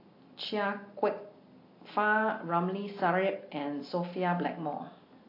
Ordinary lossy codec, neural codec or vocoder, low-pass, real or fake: AAC, 32 kbps; none; 5.4 kHz; real